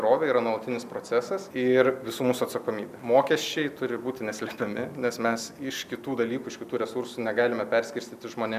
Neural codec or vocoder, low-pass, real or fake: none; 14.4 kHz; real